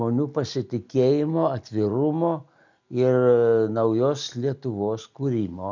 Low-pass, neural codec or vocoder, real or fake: 7.2 kHz; none; real